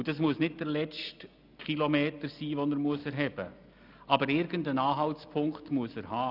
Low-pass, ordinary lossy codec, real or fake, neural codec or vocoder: 5.4 kHz; none; real; none